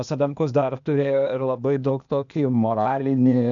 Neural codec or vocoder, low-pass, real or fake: codec, 16 kHz, 0.8 kbps, ZipCodec; 7.2 kHz; fake